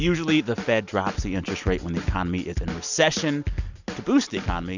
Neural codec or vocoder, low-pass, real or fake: none; 7.2 kHz; real